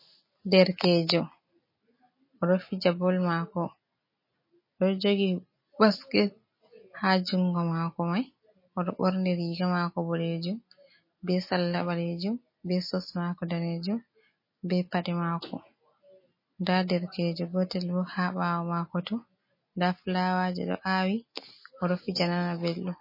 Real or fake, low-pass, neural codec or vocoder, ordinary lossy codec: real; 5.4 kHz; none; MP3, 24 kbps